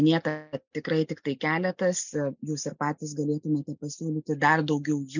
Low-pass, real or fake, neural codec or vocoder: 7.2 kHz; real; none